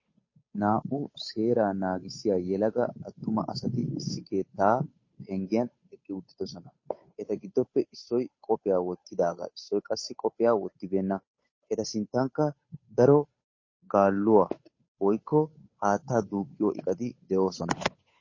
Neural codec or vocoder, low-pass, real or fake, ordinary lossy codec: codec, 16 kHz, 8 kbps, FunCodec, trained on Chinese and English, 25 frames a second; 7.2 kHz; fake; MP3, 32 kbps